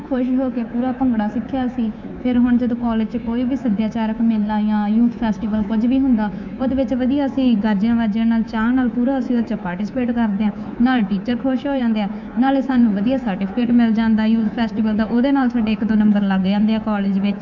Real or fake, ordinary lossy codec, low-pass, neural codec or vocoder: fake; MP3, 64 kbps; 7.2 kHz; codec, 24 kHz, 3.1 kbps, DualCodec